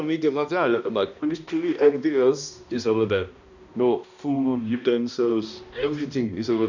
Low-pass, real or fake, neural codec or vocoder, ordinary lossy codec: 7.2 kHz; fake; codec, 16 kHz, 1 kbps, X-Codec, HuBERT features, trained on balanced general audio; none